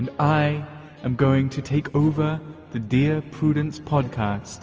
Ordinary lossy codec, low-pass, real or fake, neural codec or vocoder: Opus, 24 kbps; 7.2 kHz; real; none